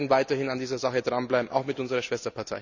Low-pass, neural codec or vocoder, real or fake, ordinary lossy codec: 7.2 kHz; none; real; none